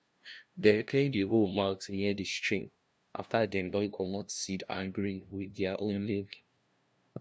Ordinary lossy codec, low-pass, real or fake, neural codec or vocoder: none; none; fake; codec, 16 kHz, 0.5 kbps, FunCodec, trained on LibriTTS, 25 frames a second